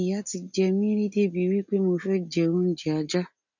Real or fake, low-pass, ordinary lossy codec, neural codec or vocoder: real; 7.2 kHz; none; none